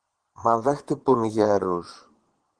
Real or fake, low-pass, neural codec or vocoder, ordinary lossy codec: fake; 9.9 kHz; vocoder, 22.05 kHz, 80 mel bands, Vocos; Opus, 16 kbps